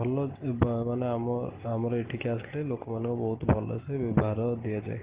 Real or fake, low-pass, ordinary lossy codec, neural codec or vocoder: real; 3.6 kHz; Opus, 16 kbps; none